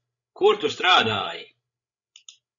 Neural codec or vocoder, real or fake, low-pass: codec, 16 kHz, 16 kbps, FreqCodec, larger model; fake; 7.2 kHz